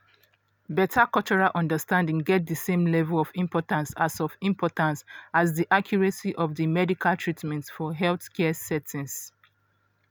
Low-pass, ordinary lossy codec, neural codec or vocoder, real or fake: none; none; none; real